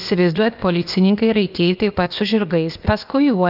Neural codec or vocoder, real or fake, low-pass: codec, 16 kHz, 0.8 kbps, ZipCodec; fake; 5.4 kHz